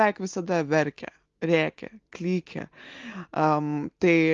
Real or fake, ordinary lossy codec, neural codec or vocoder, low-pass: real; Opus, 32 kbps; none; 7.2 kHz